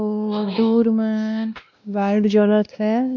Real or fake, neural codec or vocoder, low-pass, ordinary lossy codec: fake; codec, 16 kHz, 1 kbps, X-Codec, WavLM features, trained on Multilingual LibriSpeech; 7.2 kHz; none